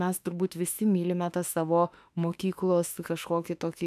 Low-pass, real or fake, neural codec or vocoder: 14.4 kHz; fake; autoencoder, 48 kHz, 32 numbers a frame, DAC-VAE, trained on Japanese speech